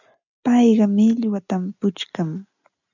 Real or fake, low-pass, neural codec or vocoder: real; 7.2 kHz; none